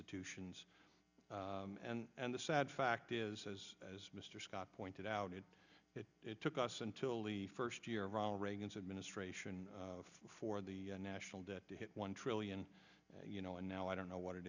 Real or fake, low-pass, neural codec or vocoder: real; 7.2 kHz; none